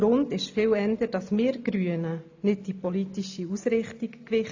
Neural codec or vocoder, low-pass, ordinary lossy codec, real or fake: none; 7.2 kHz; AAC, 48 kbps; real